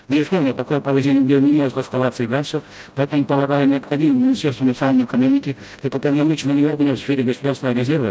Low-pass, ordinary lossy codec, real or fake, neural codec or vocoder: none; none; fake; codec, 16 kHz, 0.5 kbps, FreqCodec, smaller model